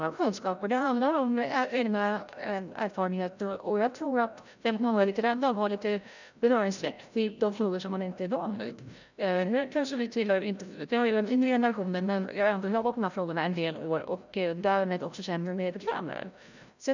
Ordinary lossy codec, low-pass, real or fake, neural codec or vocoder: none; 7.2 kHz; fake; codec, 16 kHz, 0.5 kbps, FreqCodec, larger model